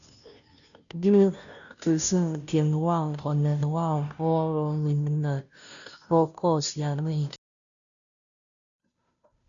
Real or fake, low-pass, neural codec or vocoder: fake; 7.2 kHz; codec, 16 kHz, 0.5 kbps, FunCodec, trained on Chinese and English, 25 frames a second